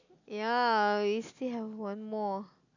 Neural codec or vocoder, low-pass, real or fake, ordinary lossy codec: none; 7.2 kHz; real; none